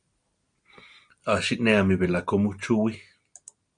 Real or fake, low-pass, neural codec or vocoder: real; 9.9 kHz; none